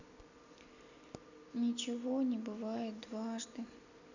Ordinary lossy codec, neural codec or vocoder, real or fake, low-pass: none; none; real; 7.2 kHz